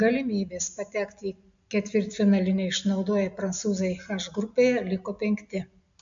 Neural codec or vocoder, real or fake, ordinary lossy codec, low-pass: none; real; MP3, 64 kbps; 7.2 kHz